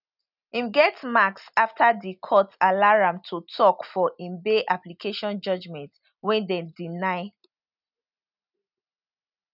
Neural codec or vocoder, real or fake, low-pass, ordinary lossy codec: none; real; 5.4 kHz; none